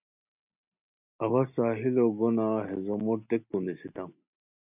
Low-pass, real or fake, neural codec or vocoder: 3.6 kHz; real; none